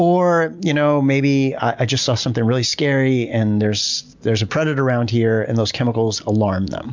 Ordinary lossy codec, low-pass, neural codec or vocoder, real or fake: MP3, 64 kbps; 7.2 kHz; none; real